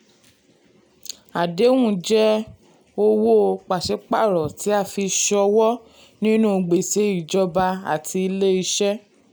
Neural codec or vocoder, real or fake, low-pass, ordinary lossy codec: none; real; none; none